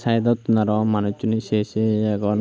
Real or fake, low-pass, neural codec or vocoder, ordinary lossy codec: real; none; none; none